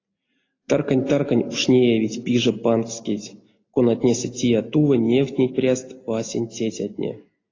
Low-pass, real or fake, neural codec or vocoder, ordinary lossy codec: 7.2 kHz; real; none; AAC, 32 kbps